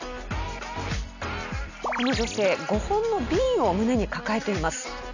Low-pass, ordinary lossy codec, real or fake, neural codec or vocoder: 7.2 kHz; none; real; none